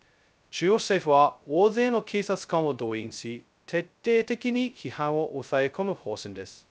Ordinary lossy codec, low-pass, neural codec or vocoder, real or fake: none; none; codec, 16 kHz, 0.2 kbps, FocalCodec; fake